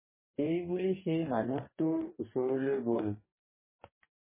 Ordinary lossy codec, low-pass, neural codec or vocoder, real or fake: MP3, 16 kbps; 3.6 kHz; codec, 44.1 kHz, 2.6 kbps, DAC; fake